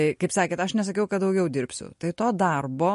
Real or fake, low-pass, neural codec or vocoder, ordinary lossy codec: real; 14.4 kHz; none; MP3, 48 kbps